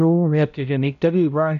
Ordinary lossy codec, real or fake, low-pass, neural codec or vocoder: none; fake; 7.2 kHz; codec, 16 kHz, 0.5 kbps, X-Codec, HuBERT features, trained on balanced general audio